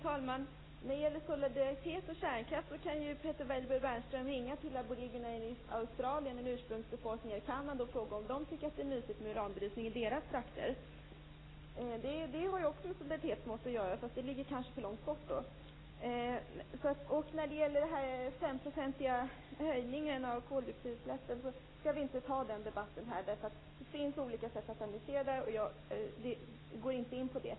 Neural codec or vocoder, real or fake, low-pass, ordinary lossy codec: none; real; 7.2 kHz; AAC, 16 kbps